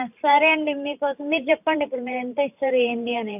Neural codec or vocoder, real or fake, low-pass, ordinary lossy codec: vocoder, 44.1 kHz, 128 mel bands every 512 samples, BigVGAN v2; fake; 3.6 kHz; AAC, 32 kbps